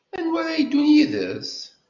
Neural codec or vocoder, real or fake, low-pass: none; real; 7.2 kHz